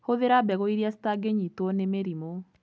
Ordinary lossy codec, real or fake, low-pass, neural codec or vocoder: none; real; none; none